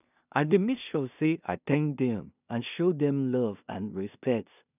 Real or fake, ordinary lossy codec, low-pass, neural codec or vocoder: fake; none; 3.6 kHz; codec, 16 kHz in and 24 kHz out, 0.4 kbps, LongCat-Audio-Codec, two codebook decoder